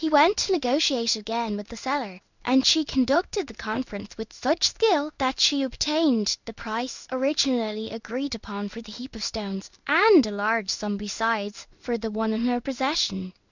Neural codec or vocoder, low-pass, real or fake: codec, 16 kHz in and 24 kHz out, 1 kbps, XY-Tokenizer; 7.2 kHz; fake